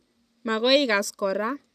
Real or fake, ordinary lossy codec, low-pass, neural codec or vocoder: real; none; 14.4 kHz; none